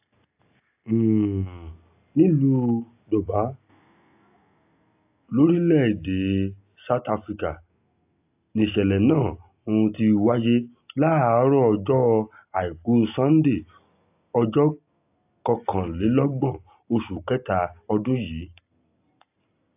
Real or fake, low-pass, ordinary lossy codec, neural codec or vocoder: real; 3.6 kHz; AAC, 32 kbps; none